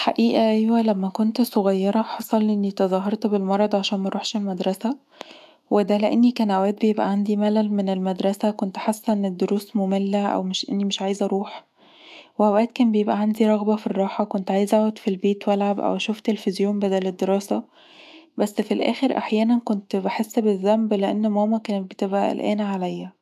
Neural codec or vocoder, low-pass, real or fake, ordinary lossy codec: autoencoder, 48 kHz, 128 numbers a frame, DAC-VAE, trained on Japanese speech; 14.4 kHz; fake; AAC, 96 kbps